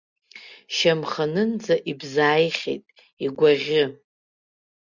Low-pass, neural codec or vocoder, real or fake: 7.2 kHz; none; real